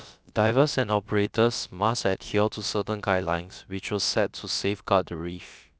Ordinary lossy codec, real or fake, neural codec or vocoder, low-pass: none; fake; codec, 16 kHz, about 1 kbps, DyCAST, with the encoder's durations; none